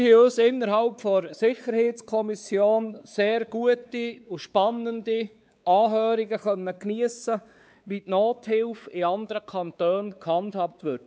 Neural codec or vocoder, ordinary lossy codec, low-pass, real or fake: codec, 16 kHz, 4 kbps, X-Codec, WavLM features, trained on Multilingual LibriSpeech; none; none; fake